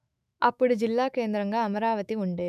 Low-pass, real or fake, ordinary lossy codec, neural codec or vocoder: 14.4 kHz; fake; none; autoencoder, 48 kHz, 128 numbers a frame, DAC-VAE, trained on Japanese speech